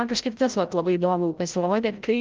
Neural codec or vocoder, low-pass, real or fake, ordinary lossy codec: codec, 16 kHz, 0.5 kbps, FreqCodec, larger model; 7.2 kHz; fake; Opus, 32 kbps